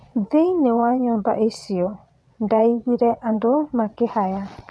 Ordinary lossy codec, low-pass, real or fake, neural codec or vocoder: none; none; fake; vocoder, 22.05 kHz, 80 mel bands, WaveNeXt